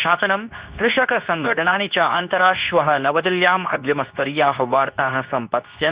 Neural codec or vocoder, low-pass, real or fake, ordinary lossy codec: codec, 24 kHz, 0.9 kbps, WavTokenizer, medium speech release version 2; 3.6 kHz; fake; Opus, 64 kbps